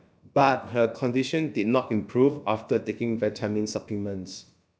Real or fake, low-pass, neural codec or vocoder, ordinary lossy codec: fake; none; codec, 16 kHz, about 1 kbps, DyCAST, with the encoder's durations; none